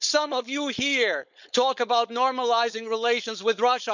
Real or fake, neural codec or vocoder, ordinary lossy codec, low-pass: fake; codec, 16 kHz, 4.8 kbps, FACodec; none; 7.2 kHz